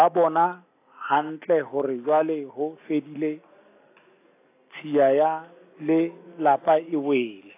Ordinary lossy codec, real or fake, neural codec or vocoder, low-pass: AAC, 24 kbps; real; none; 3.6 kHz